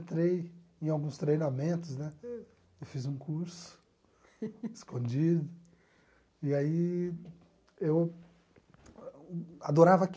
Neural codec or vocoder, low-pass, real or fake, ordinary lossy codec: none; none; real; none